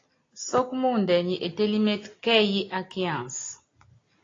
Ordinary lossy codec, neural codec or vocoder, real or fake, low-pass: AAC, 32 kbps; none; real; 7.2 kHz